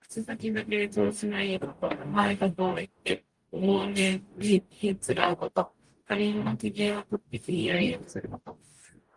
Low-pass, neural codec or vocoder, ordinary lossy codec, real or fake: 10.8 kHz; codec, 44.1 kHz, 0.9 kbps, DAC; Opus, 24 kbps; fake